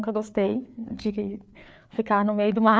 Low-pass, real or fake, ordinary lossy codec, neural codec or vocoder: none; fake; none; codec, 16 kHz, 4 kbps, FreqCodec, larger model